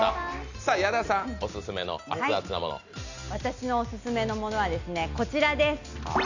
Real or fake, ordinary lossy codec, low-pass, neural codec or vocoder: real; none; 7.2 kHz; none